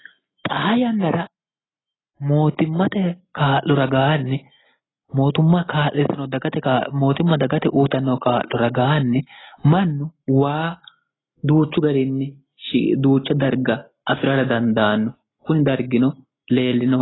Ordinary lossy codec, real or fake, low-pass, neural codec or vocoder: AAC, 16 kbps; real; 7.2 kHz; none